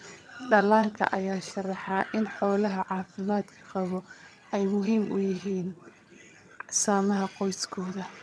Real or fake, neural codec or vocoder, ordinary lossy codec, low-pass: fake; vocoder, 22.05 kHz, 80 mel bands, HiFi-GAN; none; none